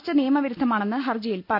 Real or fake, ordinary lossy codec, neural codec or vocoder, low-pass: real; none; none; 5.4 kHz